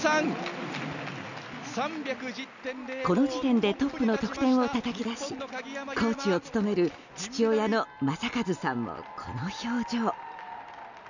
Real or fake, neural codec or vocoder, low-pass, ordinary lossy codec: real; none; 7.2 kHz; none